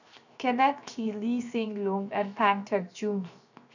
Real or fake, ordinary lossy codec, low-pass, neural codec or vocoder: fake; none; 7.2 kHz; codec, 16 kHz, 0.7 kbps, FocalCodec